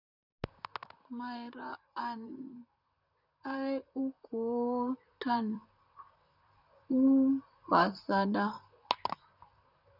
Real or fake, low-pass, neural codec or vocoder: fake; 5.4 kHz; vocoder, 44.1 kHz, 128 mel bands, Pupu-Vocoder